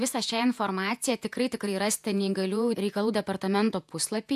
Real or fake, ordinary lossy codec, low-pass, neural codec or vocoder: fake; AAC, 96 kbps; 14.4 kHz; vocoder, 48 kHz, 128 mel bands, Vocos